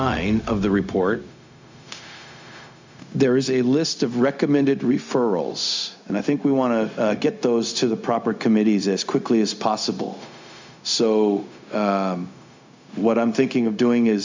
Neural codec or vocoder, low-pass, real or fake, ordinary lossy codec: codec, 16 kHz, 0.4 kbps, LongCat-Audio-Codec; 7.2 kHz; fake; MP3, 64 kbps